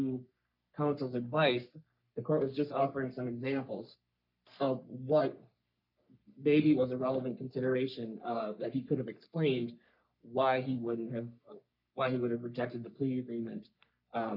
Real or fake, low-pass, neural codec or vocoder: fake; 5.4 kHz; codec, 44.1 kHz, 3.4 kbps, Pupu-Codec